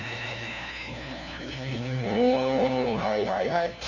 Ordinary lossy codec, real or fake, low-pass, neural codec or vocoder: none; fake; 7.2 kHz; codec, 16 kHz, 1 kbps, FunCodec, trained on LibriTTS, 50 frames a second